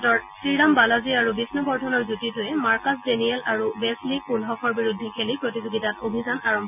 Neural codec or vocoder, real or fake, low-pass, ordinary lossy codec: none; real; 3.6 kHz; none